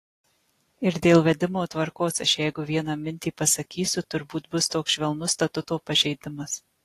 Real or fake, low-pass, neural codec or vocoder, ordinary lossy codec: real; 14.4 kHz; none; AAC, 48 kbps